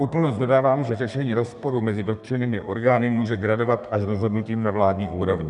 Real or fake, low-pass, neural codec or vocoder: fake; 10.8 kHz; codec, 32 kHz, 1.9 kbps, SNAC